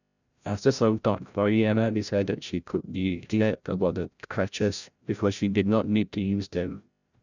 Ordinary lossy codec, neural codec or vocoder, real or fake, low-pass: none; codec, 16 kHz, 0.5 kbps, FreqCodec, larger model; fake; 7.2 kHz